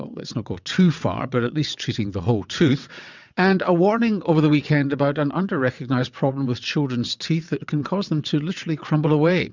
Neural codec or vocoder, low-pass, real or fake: vocoder, 22.05 kHz, 80 mel bands, WaveNeXt; 7.2 kHz; fake